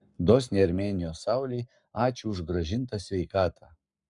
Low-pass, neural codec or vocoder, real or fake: 10.8 kHz; codec, 44.1 kHz, 7.8 kbps, Pupu-Codec; fake